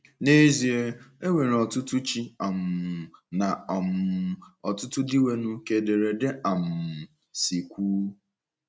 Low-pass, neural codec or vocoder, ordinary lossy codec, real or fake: none; none; none; real